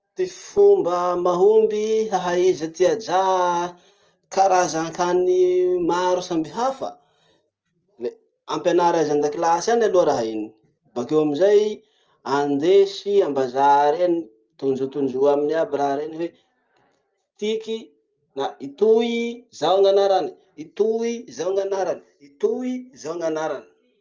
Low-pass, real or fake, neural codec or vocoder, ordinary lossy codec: 7.2 kHz; real; none; Opus, 24 kbps